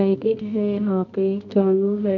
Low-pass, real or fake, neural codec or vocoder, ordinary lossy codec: 7.2 kHz; fake; codec, 24 kHz, 0.9 kbps, WavTokenizer, medium music audio release; none